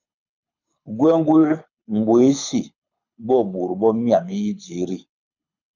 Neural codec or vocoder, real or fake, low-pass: codec, 24 kHz, 6 kbps, HILCodec; fake; 7.2 kHz